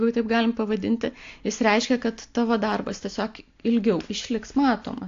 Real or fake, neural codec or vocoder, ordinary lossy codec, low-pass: real; none; AAC, 48 kbps; 7.2 kHz